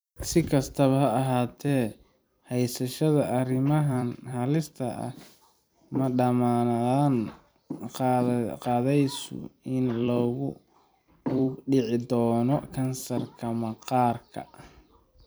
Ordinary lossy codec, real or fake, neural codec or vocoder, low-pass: none; fake; vocoder, 44.1 kHz, 128 mel bands every 256 samples, BigVGAN v2; none